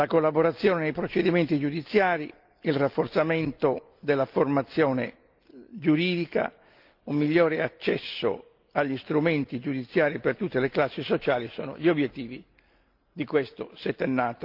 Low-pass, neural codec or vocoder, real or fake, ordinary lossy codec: 5.4 kHz; none; real; Opus, 24 kbps